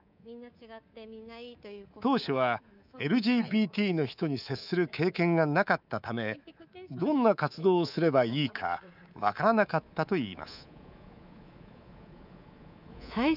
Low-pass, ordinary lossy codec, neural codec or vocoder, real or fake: 5.4 kHz; none; codec, 24 kHz, 3.1 kbps, DualCodec; fake